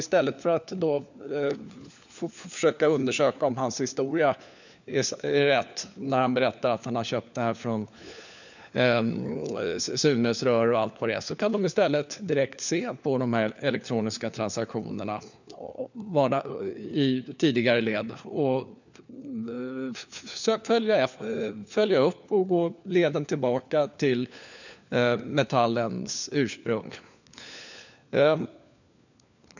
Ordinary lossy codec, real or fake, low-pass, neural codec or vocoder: none; fake; 7.2 kHz; codec, 16 kHz, 4 kbps, FunCodec, trained on LibriTTS, 50 frames a second